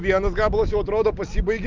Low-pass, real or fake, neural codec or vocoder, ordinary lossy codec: 7.2 kHz; real; none; Opus, 24 kbps